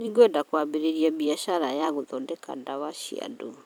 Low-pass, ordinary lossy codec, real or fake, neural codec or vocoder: none; none; fake; vocoder, 44.1 kHz, 128 mel bands every 256 samples, BigVGAN v2